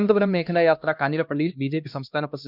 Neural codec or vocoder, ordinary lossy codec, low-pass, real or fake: codec, 16 kHz, 1 kbps, X-Codec, HuBERT features, trained on LibriSpeech; none; 5.4 kHz; fake